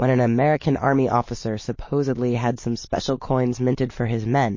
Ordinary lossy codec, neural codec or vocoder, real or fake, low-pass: MP3, 32 kbps; none; real; 7.2 kHz